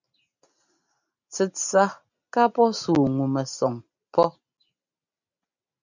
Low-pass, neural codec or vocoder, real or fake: 7.2 kHz; none; real